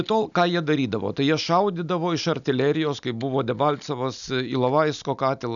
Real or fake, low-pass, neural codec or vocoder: real; 7.2 kHz; none